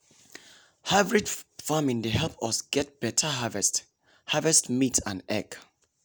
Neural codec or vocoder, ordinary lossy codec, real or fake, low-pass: none; none; real; none